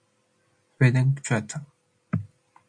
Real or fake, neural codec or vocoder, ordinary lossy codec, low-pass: real; none; MP3, 64 kbps; 9.9 kHz